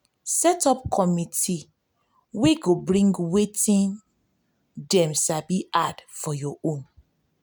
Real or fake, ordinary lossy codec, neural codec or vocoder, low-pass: real; none; none; none